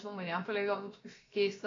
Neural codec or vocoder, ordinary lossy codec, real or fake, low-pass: codec, 16 kHz, about 1 kbps, DyCAST, with the encoder's durations; AAC, 32 kbps; fake; 7.2 kHz